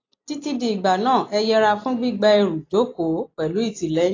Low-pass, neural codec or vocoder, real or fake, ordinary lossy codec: 7.2 kHz; none; real; AAC, 32 kbps